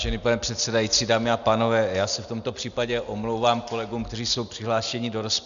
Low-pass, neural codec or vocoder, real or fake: 7.2 kHz; none; real